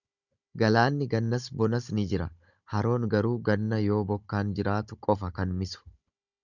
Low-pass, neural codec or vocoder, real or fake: 7.2 kHz; codec, 16 kHz, 16 kbps, FunCodec, trained on Chinese and English, 50 frames a second; fake